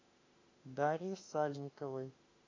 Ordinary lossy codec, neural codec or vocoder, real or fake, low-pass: AAC, 32 kbps; autoencoder, 48 kHz, 32 numbers a frame, DAC-VAE, trained on Japanese speech; fake; 7.2 kHz